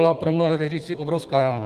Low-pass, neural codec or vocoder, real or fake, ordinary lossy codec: 14.4 kHz; codec, 32 kHz, 1.9 kbps, SNAC; fake; Opus, 24 kbps